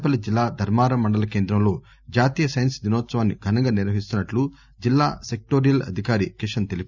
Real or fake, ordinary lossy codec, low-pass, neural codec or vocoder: real; none; 7.2 kHz; none